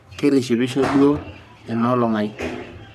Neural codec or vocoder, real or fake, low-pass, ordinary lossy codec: codec, 44.1 kHz, 3.4 kbps, Pupu-Codec; fake; 14.4 kHz; none